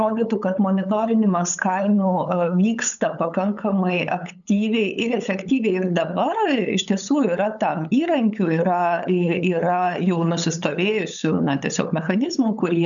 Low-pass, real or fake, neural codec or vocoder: 7.2 kHz; fake; codec, 16 kHz, 8 kbps, FunCodec, trained on LibriTTS, 25 frames a second